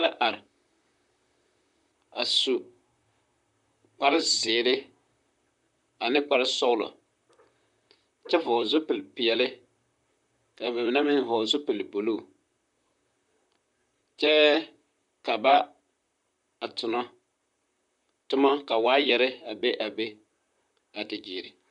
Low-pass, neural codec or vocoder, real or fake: 10.8 kHz; vocoder, 44.1 kHz, 128 mel bands, Pupu-Vocoder; fake